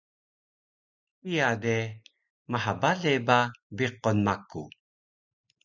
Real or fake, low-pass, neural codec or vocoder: real; 7.2 kHz; none